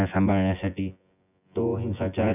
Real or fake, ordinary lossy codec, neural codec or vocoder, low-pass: fake; none; vocoder, 24 kHz, 100 mel bands, Vocos; 3.6 kHz